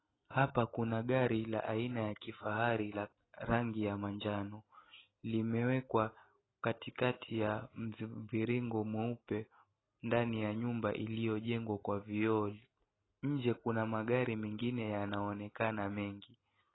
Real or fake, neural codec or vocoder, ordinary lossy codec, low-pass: real; none; AAC, 16 kbps; 7.2 kHz